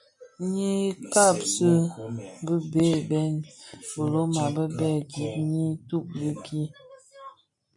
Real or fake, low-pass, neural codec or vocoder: real; 10.8 kHz; none